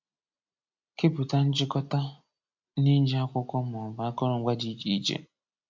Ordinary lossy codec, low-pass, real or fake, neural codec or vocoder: MP3, 64 kbps; 7.2 kHz; real; none